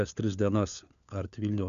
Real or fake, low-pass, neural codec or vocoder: fake; 7.2 kHz; codec, 16 kHz, 4 kbps, FunCodec, trained on LibriTTS, 50 frames a second